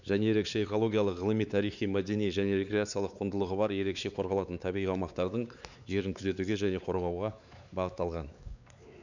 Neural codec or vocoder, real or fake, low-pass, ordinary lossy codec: codec, 16 kHz, 4 kbps, X-Codec, WavLM features, trained on Multilingual LibriSpeech; fake; 7.2 kHz; none